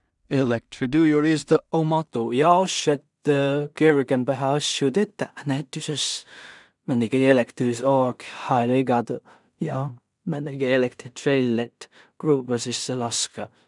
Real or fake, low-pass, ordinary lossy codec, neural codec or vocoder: fake; 10.8 kHz; none; codec, 16 kHz in and 24 kHz out, 0.4 kbps, LongCat-Audio-Codec, two codebook decoder